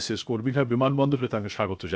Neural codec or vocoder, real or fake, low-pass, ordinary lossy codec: codec, 16 kHz, 0.7 kbps, FocalCodec; fake; none; none